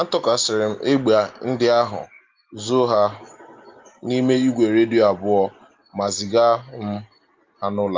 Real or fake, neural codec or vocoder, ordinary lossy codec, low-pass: real; none; Opus, 32 kbps; 7.2 kHz